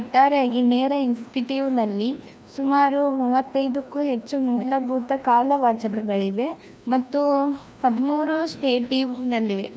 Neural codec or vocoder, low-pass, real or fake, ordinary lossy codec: codec, 16 kHz, 1 kbps, FreqCodec, larger model; none; fake; none